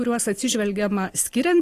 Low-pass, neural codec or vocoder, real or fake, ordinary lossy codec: 14.4 kHz; none; real; AAC, 48 kbps